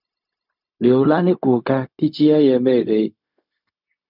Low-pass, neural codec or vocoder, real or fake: 5.4 kHz; codec, 16 kHz, 0.4 kbps, LongCat-Audio-Codec; fake